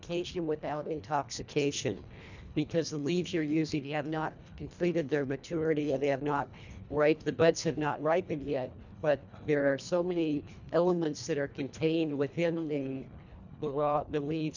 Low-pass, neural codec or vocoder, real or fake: 7.2 kHz; codec, 24 kHz, 1.5 kbps, HILCodec; fake